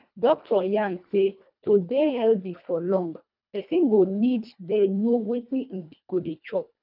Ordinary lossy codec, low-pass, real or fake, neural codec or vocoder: none; 5.4 kHz; fake; codec, 24 kHz, 1.5 kbps, HILCodec